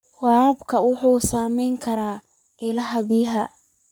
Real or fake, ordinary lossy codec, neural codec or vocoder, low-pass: fake; none; codec, 44.1 kHz, 3.4 kbps, Pupu-Codec; none